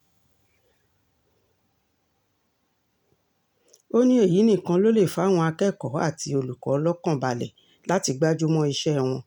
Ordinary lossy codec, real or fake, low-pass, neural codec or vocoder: none; real; 19.8 kHz; none